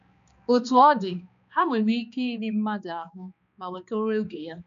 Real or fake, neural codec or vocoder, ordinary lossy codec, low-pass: fake; codec, 16 kHz, 2 kbps, X-Codec, HuBERT features, trained on balanced general audio; none; 7.2 kHz